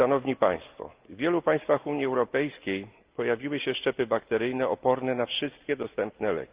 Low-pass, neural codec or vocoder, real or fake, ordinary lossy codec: 3.6 kHz; none; real; Opus, 24 kbps